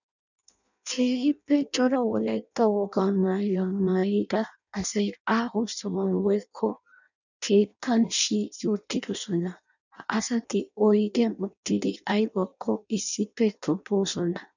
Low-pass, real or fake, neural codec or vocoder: 7.2 kHz; fake; codec, 16 kHz in and 24 kHz out, 0.6 kbps, FireRedTTS-2 codec